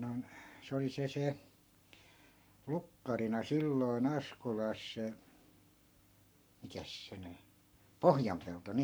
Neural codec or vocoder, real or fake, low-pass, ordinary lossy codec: codec, 44.1 kHz, 7.8 kbps, Pupu-Codec; fake; none; none